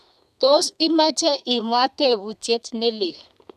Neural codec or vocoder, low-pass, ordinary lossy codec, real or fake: codec, 32 kHz, 1.9 kbps, SNAC; 14.4 kHz; none; fake